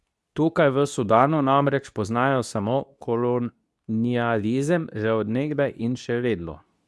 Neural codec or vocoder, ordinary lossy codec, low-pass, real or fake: codec, 24 kHz, 0.9 kbps, WavTokenizer, medium speech release version 2; none; none; fake